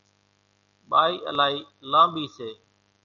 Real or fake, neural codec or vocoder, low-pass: real; none; 7.2 kHz